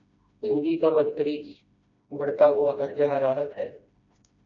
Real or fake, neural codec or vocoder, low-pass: fake; codec, 16 kHz, 1 kbps, FreqCodec, smaller model; 7.2 kHz